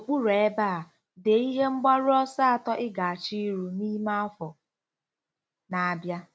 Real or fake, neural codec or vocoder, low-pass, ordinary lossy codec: real; none; none; none